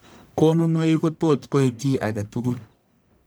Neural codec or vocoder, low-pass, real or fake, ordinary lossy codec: codec, 44.1 kHz, 1.7 kbps, Pupu-Codec; none; fake; none